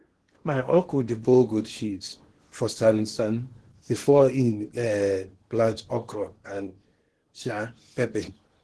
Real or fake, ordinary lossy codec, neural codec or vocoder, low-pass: fake; Opus, 16 kbps; codec, 16 kHz in and 24 kHz out, 0.8 kbps, FocalCodec, streaming, 65536 codes; 10.8 kHz